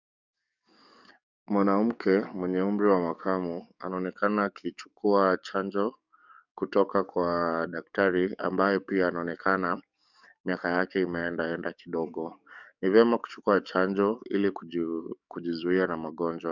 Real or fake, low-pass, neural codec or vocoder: fake; 7.2 kHz; codec, 44.1 kHz, 7.8 kbps, DAC